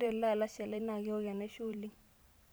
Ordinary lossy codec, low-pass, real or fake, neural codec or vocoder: none; none; fake; vocoder, 44.1 kHz, 128 mel bands every 512 samples, BigVGAN v2